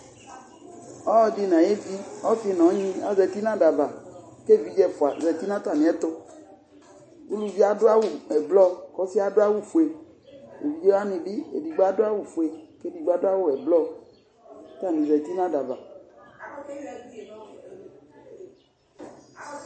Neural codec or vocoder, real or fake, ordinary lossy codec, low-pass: none; real; MP3, 32 kbps; 9.9 kHz